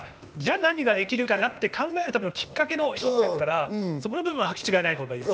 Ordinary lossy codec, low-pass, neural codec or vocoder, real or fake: none; none; codec, 16 kHz, 0.8 kbps, ZipCodec; fake